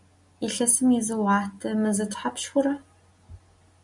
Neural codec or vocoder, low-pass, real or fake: none; 10.8 kHz; real